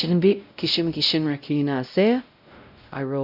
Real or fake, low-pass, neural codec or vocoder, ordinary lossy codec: fake; 5.4 kHz; codec, 16 kHz, 0.5 kbps, X-Codec, WavLM features, trained on Multilingual LibriSpeech; none